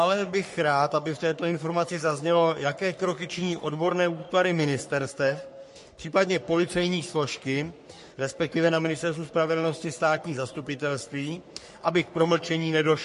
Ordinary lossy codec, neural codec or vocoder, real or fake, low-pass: MP3, 48 kbps; codec, 44.1 kHz, 3.4 kbps, Pupu-Codec; fake; 14.4 kHz